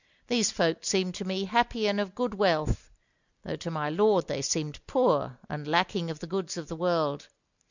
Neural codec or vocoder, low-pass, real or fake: none; 7.2 kHz; real